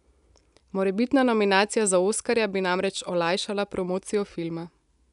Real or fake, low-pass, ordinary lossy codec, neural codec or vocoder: real; 10.8 kHz; none; none